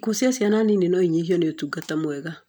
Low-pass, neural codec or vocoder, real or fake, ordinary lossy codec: none; none; real; none